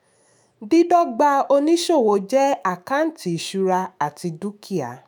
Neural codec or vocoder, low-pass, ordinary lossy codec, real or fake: autoencoder, 48 kHz, 128 numbers a frame, DAC-VAE, trained on Japanese speech; none; none; fake